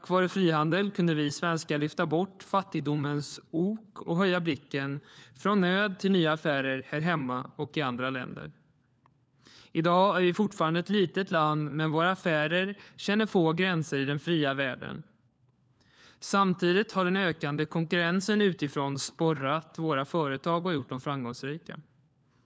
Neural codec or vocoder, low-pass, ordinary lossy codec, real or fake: codec, 16 kHz, 4 kbps, FunCodec, trained on LibriTTS, 50 frames a second; none; none; fake